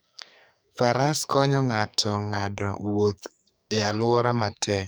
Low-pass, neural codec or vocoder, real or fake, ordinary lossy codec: none; codec, 44.1 kHz, 2.6 kbps, SNAC; fake; none